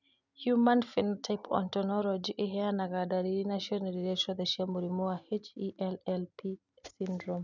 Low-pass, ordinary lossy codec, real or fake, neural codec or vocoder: 7.2 kHz; none; real; none